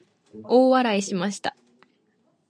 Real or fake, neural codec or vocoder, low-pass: real; none; 9.9 kHz